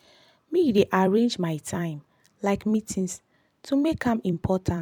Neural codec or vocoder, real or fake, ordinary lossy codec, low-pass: vocoder, 44.1 kHz, 128 mel bands every 256 samples, BigVGAN v2; fake; MP3, 96 kbps; 19.8 kHz